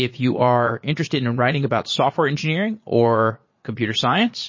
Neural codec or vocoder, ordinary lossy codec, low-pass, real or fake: vocoder, 22.05 kHz, 80 mel bands, Vocos; MP3, 32 kbps; 7.2 kHz; fake